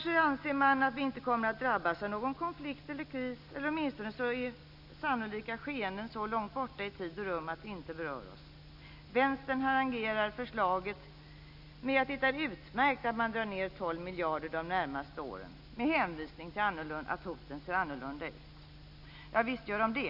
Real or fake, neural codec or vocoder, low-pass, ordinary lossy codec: real; none; 5.4 kHz; none